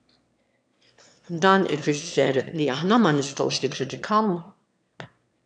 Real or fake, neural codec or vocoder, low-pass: fake; autoencoder, 22.05 kHz, a latent of 192 numbers a frame, VITS, trained on one speaker; 9.9 kHz